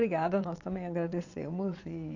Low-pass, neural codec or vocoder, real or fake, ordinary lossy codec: 7.2 kHz; vocoder, 22.05 kHz, 80 mel bands, WaveNeXt; fake; none